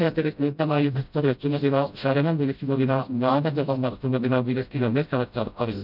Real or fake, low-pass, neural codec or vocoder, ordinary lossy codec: fake; 5.4 kHz; codec, 16 kHz, 0.5 kbps, FreqCodec, smaller model; none